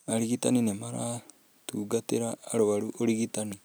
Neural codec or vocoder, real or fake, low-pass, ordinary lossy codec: none; real; none; none